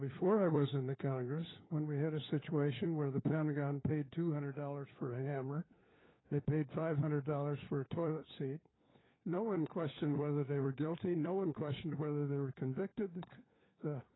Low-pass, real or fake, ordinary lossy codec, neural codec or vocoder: 7.2 kHz; fake; AAC, 16 kbps; codec, 16 kHz, 4 kbps, FunCodec, trained on LibriTTS, 50 frames a second